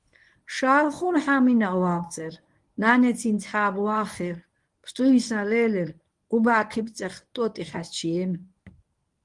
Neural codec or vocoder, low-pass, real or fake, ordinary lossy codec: codec, 24 kHz, 0.9 kbps, WavTokenizer, medium speech release version 1; 10.8 kHz; fake; Opus, 24 kbps